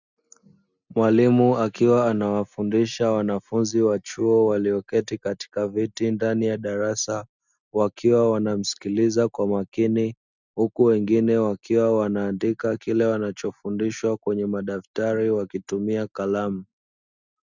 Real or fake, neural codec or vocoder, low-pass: real; none; 7.2 kHz